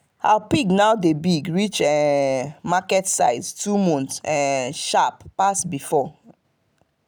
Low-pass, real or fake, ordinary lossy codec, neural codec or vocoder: none; real; none; none